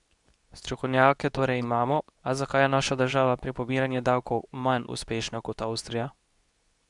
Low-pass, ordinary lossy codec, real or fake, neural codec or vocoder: 10.8 kHz; none; fake; codec, 24 kHz, 0.9 kbps, WavTokenizer, medium speech release version 2